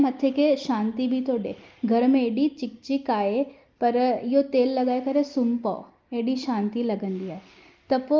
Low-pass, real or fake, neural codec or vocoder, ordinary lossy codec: 7.2 kHz; real; none; Opus, 32 kbps